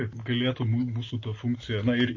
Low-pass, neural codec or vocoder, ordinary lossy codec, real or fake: 7.2 kHz; none; MP3, 32 kbps; real